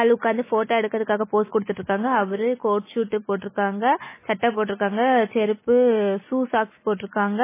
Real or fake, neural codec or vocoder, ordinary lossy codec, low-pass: real; none; MP3, 16 kbps; 3.6 kHz